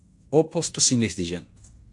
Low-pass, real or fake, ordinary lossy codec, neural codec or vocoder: 10.8 kHz; fake; AAC, 64 kbps; codec, 16 kHz in and 24 kHz out, 0.9 kbps, LongCat-Audio-Codec, fine tuned four codebook decoder